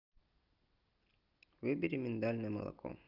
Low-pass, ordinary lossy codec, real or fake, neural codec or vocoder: 5.4 kHz; Opus, 32 kbps; real; none